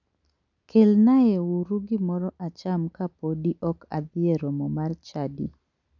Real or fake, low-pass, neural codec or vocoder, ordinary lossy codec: real; 7.2 kHz; none; none